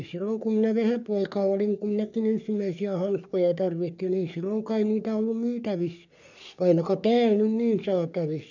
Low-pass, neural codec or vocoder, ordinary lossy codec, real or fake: 7.2 kHz; codec, 44.1 kHz, 3.4 kbps, Pupu-Codec; none; fake